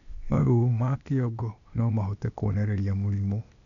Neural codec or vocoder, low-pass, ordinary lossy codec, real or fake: codec, 16 kHz, 0.8 kbps, ZipCodec; 7.2 kHz; none; fake